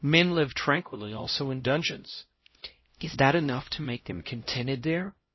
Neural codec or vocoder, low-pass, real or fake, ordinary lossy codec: codec, 16 kHz, 0.5 kbps, X-Codec, HuBERT features, trained on LibriSpeech; 7.2 kHz; fake; MP3, 24 kbps